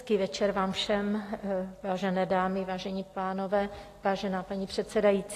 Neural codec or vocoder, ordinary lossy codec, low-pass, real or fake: none; AAC, 48 kbps; 14.4 kHz; real